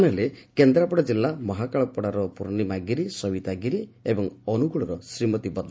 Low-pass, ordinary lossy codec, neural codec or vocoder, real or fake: none; none; none; real